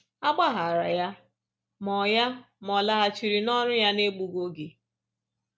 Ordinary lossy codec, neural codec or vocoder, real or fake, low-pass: none; none; real; none